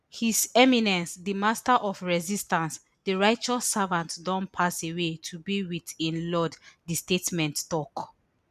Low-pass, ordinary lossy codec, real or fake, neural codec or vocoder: 14.4 kHz; none; real; none